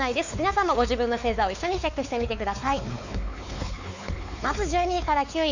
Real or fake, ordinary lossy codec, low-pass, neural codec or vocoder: fake; none; 7.2 kHz; codec, 16 kHz, 4 kbps, X-Codec, WavLM features, trained on Multilingual LibriSpeech